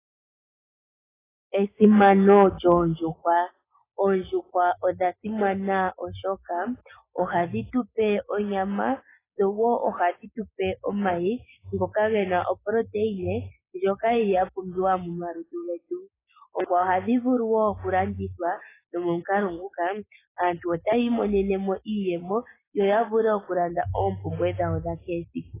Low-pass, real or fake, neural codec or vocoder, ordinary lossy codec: 3.6 kHz; real; none; AAC, 16 kbps